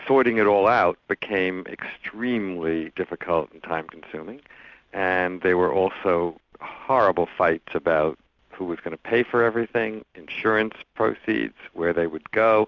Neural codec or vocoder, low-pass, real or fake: none; 7.2 kHz; real